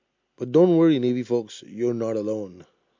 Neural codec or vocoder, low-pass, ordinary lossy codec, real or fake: none; 7.2 kHz; MP3, 48 kbps; real